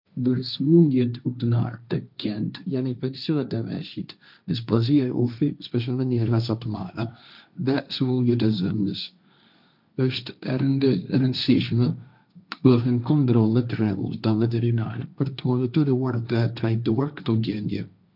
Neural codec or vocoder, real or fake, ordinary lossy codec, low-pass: codec, 16 kHz, 1.1 kbps, Voila-Tokenizer; fake; none; 5.4 kHz